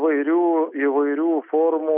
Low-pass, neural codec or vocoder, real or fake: 3.6 kHz; none; real